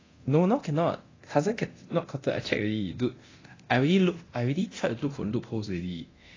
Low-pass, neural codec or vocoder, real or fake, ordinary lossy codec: 7.2 kHz; codec, 24 kHz, 0.9 kbps, DualCodec; fake; AAC, 32 kbps